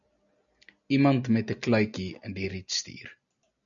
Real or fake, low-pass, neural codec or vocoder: real; 7.2 kHz; none